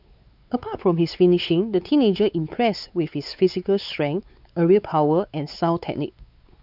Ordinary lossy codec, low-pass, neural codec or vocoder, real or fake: none; 5.4 kHz; codec, 16 kHz, 4 kbps, X-Codec, WavLM features, trained on Multilingual LibriSpeech; fake